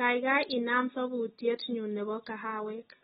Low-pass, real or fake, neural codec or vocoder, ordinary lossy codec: 19.8 kHz; real; none; AAC, 16 kbps